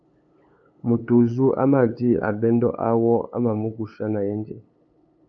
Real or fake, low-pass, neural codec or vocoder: fake; 7.2 kHz; codec, 16 kHz, 8 kbps, FunCodec, trained on LibriTTS, 25 frames a second